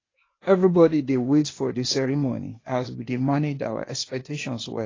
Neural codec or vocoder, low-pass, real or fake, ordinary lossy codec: codec, 16 kHz, 0.8 kbps, ZipCodec; 7.2 kHz; fake; AAC, 32 kbps